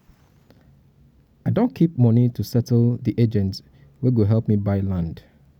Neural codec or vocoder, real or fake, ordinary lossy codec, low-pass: none; real; none; 19.8 kHz